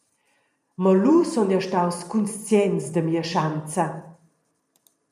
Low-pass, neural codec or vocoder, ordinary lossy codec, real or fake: 14.4 kHz; vocoder, 44.1 kHz, 128 mel bands every 512 samples, BigVGAN v2; MP3, 96 kbps; fake